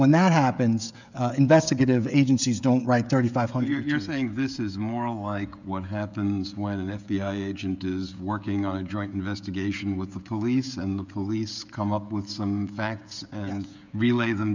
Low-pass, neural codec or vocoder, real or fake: 7.2 kHz; codec, 16 kHz, 16 kbps, FreqCodec, smaller model; fake